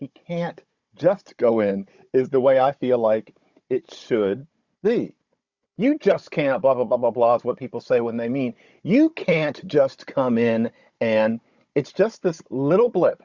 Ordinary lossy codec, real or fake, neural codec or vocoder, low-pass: Opus, 64 kbps; fake; codec, 16 kHz, 16 kbps, FunCodec, trained on Chinese and English, 50 frames a second; 7.2 kHz